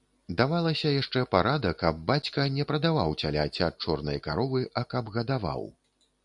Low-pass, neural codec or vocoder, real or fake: 10.8 kHz; none; real